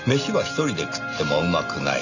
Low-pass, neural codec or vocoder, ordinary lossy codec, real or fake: 7.2 kHz; none; none; real